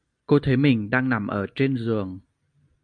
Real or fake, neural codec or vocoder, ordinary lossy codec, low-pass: real; none; MP3, 96 kbps; 9.9 kHz